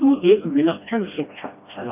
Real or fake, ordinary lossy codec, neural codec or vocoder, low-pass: fake; none; codec, 16 kHz, 1 kbps, FreqCodec, smaller model; 3.6 kHz